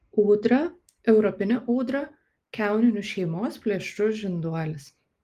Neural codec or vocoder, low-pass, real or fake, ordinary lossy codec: none; 14.4 kHz; real; Opus, 24 kbps